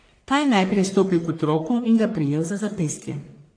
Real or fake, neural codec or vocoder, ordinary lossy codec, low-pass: fake; codec, 44.1 kHz, 1.7 kbps, Pupu-Codec; AAC, 48 kbps; 9.9 kHz